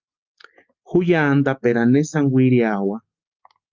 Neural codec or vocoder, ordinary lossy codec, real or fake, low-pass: none; Opus, 32 kbps; real; 7.2 kHz